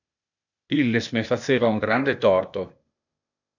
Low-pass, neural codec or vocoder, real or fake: 7.2 kHz; codec, 16 kHz, 0.8 kbps, ZipCodec; fake